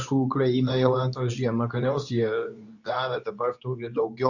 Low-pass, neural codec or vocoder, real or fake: 7.2 kHz; codec, 24 kHz, 0.9 kbps, WavTokenizer, medium speech release version 2; fake